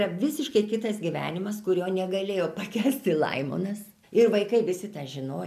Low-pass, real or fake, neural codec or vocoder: 14.4 kHz; real; none